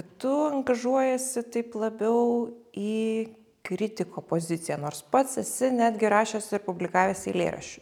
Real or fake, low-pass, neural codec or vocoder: real; 19.8 kHz; none